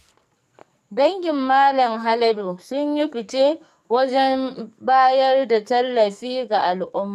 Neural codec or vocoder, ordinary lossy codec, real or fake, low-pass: codec, 44.1 kHz, 2.6 kbps, SNAC; none; fake; 14.4 kHz